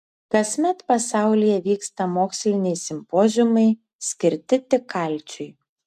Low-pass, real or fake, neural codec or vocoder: 14.4 kHz; real; none